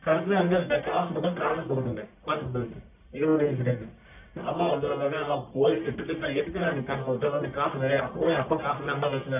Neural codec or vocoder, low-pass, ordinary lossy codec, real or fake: codec, 44.1 kHz, 1.7 kbps, Pupu-Codec; 3.6 kHz; none; fake